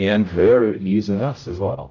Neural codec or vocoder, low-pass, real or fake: codec, 16 kHz, 0.5 kbps, X-Codec, HuBERT features, trained on general audio; 7.2 kHz; fake